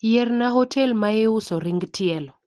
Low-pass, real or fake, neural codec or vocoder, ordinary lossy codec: 10.8 kHz; real; none; Opus, 16 kbps